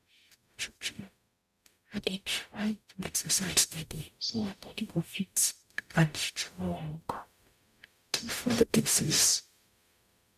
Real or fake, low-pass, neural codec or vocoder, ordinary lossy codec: fake; 14.4 kHz; codec, 44.1 kHz, 0.9 kbps, DAC; none